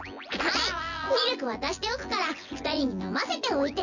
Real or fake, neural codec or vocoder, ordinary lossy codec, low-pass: fake; vocoder, 24 kHz, 100 mel bands, Vocos; none; 7.2 kHz